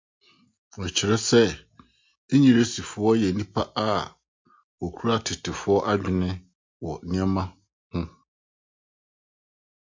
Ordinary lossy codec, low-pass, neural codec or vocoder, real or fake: MP3, 48 kbps; 7.2 kHz; none; real